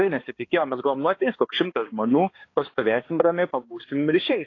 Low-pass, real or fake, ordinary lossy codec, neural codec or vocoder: 7.2 kHz; fake; AAC, 32 kbps; codec, 16 kHz, 2 kbps, X-Codec, HuBERT features, trained on balanced general audio